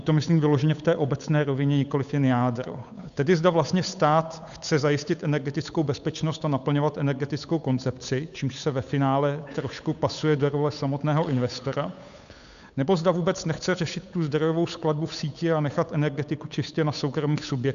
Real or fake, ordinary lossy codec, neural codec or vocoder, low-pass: fake; AAC, 96 kbps; codec, 16 kHz, 8 kbps, FunCodec, trained on Chinese and English, 25 frames a second; 7.2 kHz